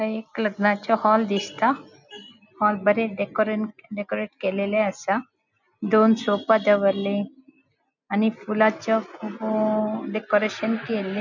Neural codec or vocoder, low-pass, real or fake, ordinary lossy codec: none; 7.2 kHz; real; none